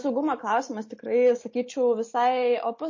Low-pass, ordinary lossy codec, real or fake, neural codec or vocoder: 7.2 kHz; MP3, 32 kbps; real; none